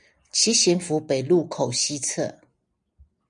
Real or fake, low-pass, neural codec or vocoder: real; 9.9 kHz; none